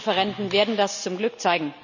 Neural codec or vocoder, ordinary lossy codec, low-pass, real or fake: none; none; 7.2 kHz; real